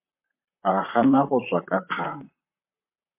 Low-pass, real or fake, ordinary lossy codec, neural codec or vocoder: 3.6 kHz; fake; MP3, 24 kbps; vocoder, 44.1 kHz, 128 mel bands, Pupu-Vocoder